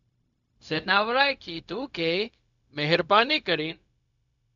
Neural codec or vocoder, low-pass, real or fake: codec, 16 kHz, 0.4 kbps, LongCat-Audio-Codec; 7.2 kHz; fake